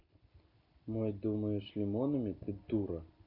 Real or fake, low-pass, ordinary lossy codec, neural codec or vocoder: real; 5.4 kHz; none; none